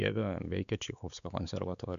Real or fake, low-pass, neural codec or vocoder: fake; 7.2 kHz; codec, 16 kHz, 4 kbps, X-Codec, WavLM features, trained on Multilingual LibriSpeech